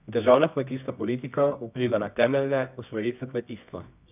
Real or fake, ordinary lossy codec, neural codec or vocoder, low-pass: fake; none; codec, 24 kHz, 0.9 kbps, WavTokenizer, medium music audio release; 3.6 kHz